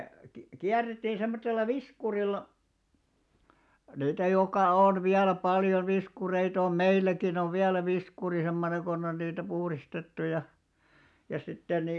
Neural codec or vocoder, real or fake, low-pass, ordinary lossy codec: none; real; none; none